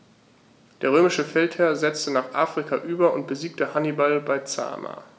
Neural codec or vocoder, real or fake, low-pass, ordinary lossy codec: none; real; none; none